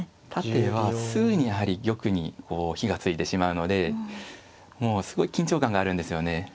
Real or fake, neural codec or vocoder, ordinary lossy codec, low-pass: real; none; none; none